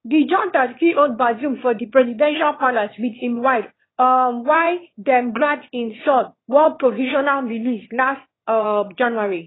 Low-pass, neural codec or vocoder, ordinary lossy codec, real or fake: 7.2 kHz; autoencoder, 22.05 kHz, a latent of 192 numbers a frame, VITS, trained on one speaker; AAC, 16 kbps; fake